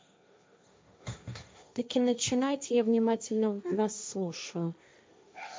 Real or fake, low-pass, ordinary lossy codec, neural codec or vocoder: fake; none; none; codec, 16 kHz, 1.1 kbps, Voila-Tokenizer